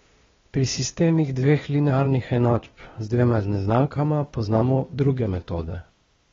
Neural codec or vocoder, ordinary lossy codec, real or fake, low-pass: codec, 16 kHz, 0.8 kbps, ZipCodec; AAC, 24 kbps; fake; 7.2 kHz